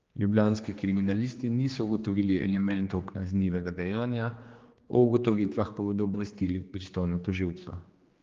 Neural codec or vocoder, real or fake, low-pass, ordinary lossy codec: codec, 16 kHz, 2 kbps, X-Codec, HuBERT features, trained on general audio; fake; 7.2 kHz; Opus, 32 kbps